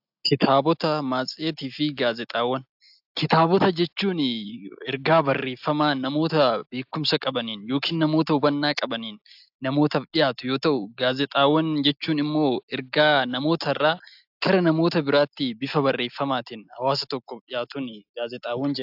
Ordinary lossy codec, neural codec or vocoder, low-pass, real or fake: AAC, 48 kbps; none; 5.4 kHz; real